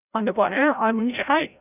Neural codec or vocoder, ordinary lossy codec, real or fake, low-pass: codec, 16 kHz, 0.5 kbps, FreqCodec, larger model; none; fake; 3.6 kHz